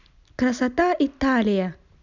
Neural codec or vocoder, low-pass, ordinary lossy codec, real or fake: none; 7.2 kHz; none; real